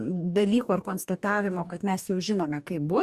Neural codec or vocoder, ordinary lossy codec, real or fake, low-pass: codec, 44.1 kHz, 2.6 kbps, DAC; Opus, 64 kbps; fake; 14.4 kHz